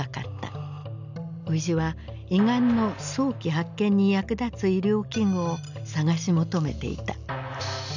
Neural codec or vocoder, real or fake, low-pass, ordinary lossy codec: none; real; 7.2 kHz; none